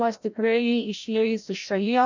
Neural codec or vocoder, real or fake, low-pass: codec, 16 kHz, 0.5 kbps, FreqCodec, larger model; fake; 7.2 kHz